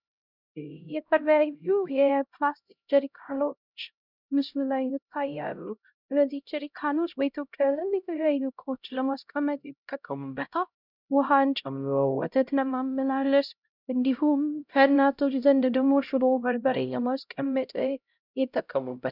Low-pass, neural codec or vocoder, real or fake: 5.4 kHz; codec, 16 kHz, 0.5 kbps, X-Codec, HuBERT features, trained on LibriSpeech; fake